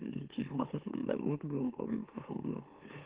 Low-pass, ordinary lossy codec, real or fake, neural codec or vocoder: 3.6 kHz; Opus, 16 kbps; fake; autoencoder, 44.1 kHz, a latent of 192 numbers a frame, MeloTTS